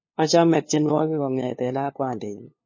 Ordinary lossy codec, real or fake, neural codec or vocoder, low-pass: MP3, 32 kbps; fake; codec, 16 kHz, 2 kbps, FunCodec, trained on LibriTTS, 25 frames a second; 7.2 kHz